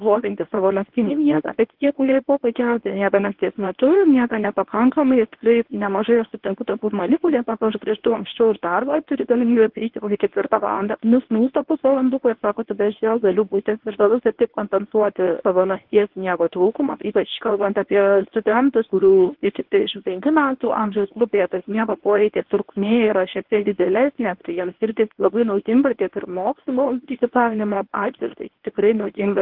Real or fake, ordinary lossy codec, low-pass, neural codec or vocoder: fake; Opus, 16 kbps; 5.4 kHz; codec, 24 kHz, 0.9 kbps, WavTokenizer, medium speech release version 1